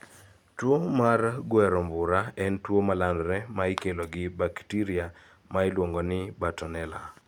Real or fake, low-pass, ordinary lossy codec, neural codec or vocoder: real; 19.8 kHz; none; none